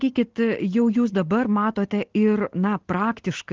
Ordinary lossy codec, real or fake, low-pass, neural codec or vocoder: Opus, 16 kbps; real; 7.2 kHz; none